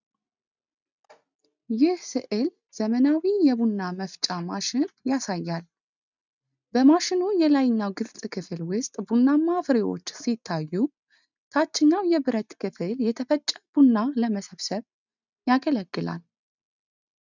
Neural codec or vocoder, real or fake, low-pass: none; real; 7.2 kHz